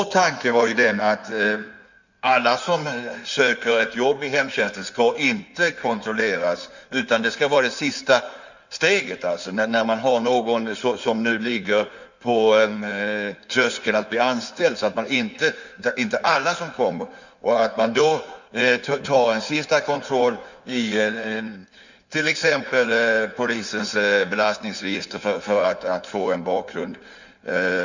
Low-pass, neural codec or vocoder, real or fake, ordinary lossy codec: 7.2 kHz; codec, 16 kHz in and 24 kHz out, 2.2 kbps, FireRedTTS-2 codec; fake; none